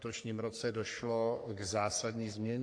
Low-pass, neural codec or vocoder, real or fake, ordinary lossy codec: 9.9 kHz; codec, 44.1 kHz, 3.4 kbps, Pupu-Codec; fake; AAC, 48 kbps